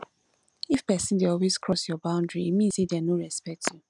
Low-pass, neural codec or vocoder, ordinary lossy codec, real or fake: 10.8 kHz; none; none; real